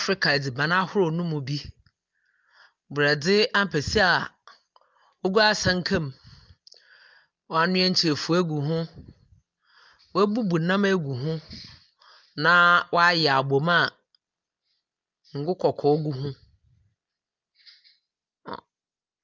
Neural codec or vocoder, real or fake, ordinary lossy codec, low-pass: none; real; Opus, 24 kbps; 7.2 kHz